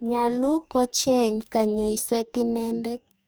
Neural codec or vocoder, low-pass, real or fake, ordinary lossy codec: codec, 44.1 kHz, 2.6 kbps, DAC; none; fake; none